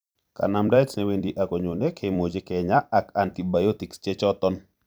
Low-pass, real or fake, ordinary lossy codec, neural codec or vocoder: none; fake; none; vocoder, 44.1 kHz, 128 mel bands every 256 samples, BigVGAN v2